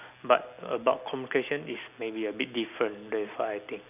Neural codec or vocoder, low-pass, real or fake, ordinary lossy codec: none; 3.6 kHz; real; none